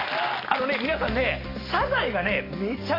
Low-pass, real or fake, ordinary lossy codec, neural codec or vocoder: 5.4 kHz; real; AAC, 24 kbps; none